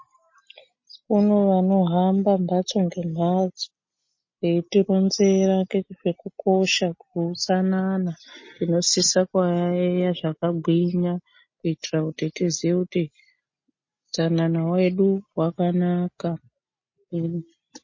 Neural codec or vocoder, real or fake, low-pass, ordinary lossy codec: none; real; 7.2 kHz; MP3, 32 kbps